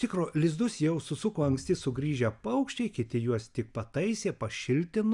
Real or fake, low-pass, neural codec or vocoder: real; 10.8 kHz; none